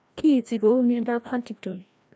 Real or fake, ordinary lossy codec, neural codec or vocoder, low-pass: fake; none; codec, 16 kHz, 1 kbps, FreqCodec, larger model; none